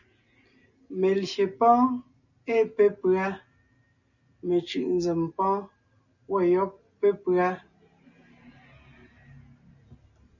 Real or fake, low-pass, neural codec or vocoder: real; 7.2 kHz; none